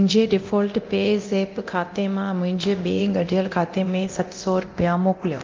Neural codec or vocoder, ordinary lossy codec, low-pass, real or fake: codec, 24 kHz, 0.9 kbps, DualCodec; Opus, 32 kbps; 7.2 kHz; fake